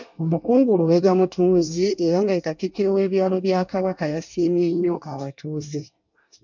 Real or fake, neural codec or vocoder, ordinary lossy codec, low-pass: fake; codec, 24 kHz, 1 kbps, SNAC; MP3, 64 kbps; 7.2 kHz